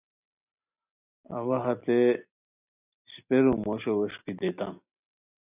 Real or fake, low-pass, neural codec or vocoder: real; 3.6 kHz; none